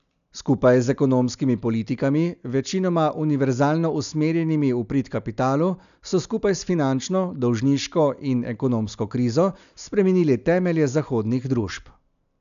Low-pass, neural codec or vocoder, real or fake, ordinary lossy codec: 7.2 kHz; none; real; none